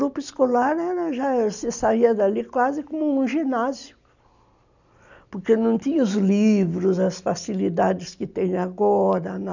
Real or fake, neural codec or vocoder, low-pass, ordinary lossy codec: real; none; 7.2 kHz; none